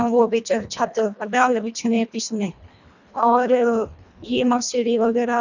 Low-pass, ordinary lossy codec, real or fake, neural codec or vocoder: 7.2 kHz; none; fake; codec, 24 kHz, 1.5 kbps, HILCodec